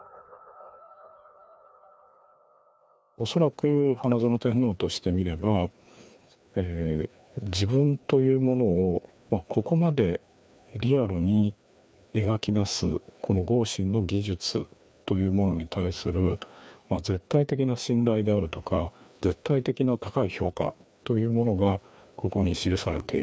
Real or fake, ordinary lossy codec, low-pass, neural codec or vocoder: fake; none; none; codec, 16 kHz, 2 kbps, FreqCodec, larger model